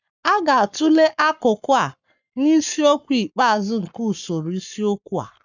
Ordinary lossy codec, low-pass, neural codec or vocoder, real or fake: none; 7.2 kHz; codec, 44.1 kHz, 7.8 kbps, Pupu-Codec; fake